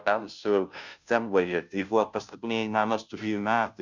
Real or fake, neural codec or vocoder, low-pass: fake; codec, 16 kHz, 0.5 kbps, FunCodec, trained on Chinese and English, 25 frames a second; 7.2 kHz